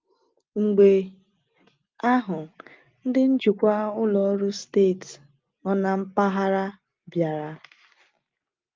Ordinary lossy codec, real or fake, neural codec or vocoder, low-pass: Opus, 32 kbps; real; none; 7.2 kHz